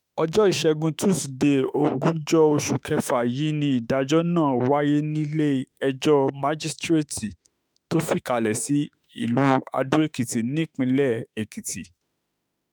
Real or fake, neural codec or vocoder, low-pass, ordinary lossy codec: fake; autoencoder, 48 kHz, 32 numbers a frame, DAC-VAE, trained on Japanese speech; none; none